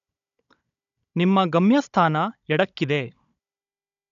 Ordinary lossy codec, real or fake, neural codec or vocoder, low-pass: none; fake; codec, 16 kHz, 16 kbps, FunCodec, trained on Chinese and English, 50 frames a second; 7.2 kHz